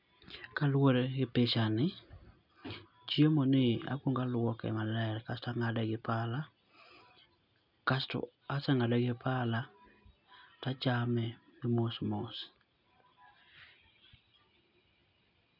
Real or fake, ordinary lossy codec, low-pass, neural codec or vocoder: real; none; 5.4 kHz; none